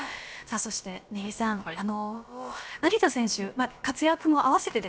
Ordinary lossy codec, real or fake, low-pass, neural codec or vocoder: none; fake; none; codec, 16 kHz, about 1 kbps, DyCAST, with the encoder's durations